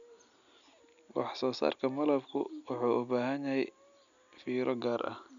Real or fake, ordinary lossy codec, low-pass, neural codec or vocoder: real; none; 7.2 kHz; none